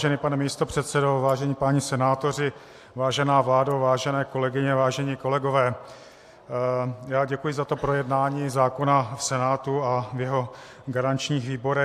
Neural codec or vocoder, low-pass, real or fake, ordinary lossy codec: none; 14.4 kHz; real; AAC, 64 kbps